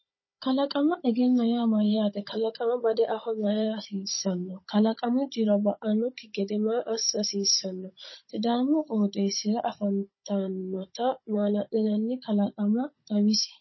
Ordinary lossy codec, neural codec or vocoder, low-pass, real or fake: MP3, 24 kbps; codec, 16 kHz, 16 kbps, FunCodec, trained on Chinese and English, 50 frames a second; 7.2 kHz; fake